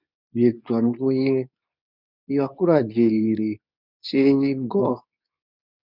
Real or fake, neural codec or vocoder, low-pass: fake; codec, 24 kHz, 0.9 kbps, WavTokenizer, medium speech release version 2; 5.4 kHz